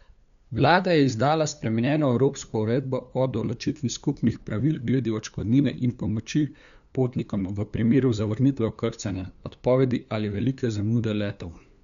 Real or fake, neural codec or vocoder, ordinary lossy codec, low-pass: fake; codec, 16 kHz, 2 kbps, FunCodec, trained on LibriTTS, 25 frames a second; none; 7.2 kHz